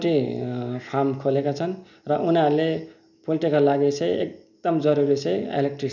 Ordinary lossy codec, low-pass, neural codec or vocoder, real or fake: none; 7.2 kHz; none; real